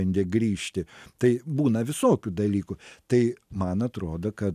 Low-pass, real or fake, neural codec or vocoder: 14.4 kHz; real; none